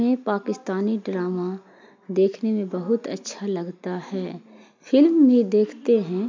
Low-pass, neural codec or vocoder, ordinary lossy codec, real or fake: 7.2 kHz; none; MP3, 64 kbps; real